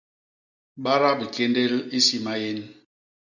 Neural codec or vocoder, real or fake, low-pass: none; real; 7.2 kHz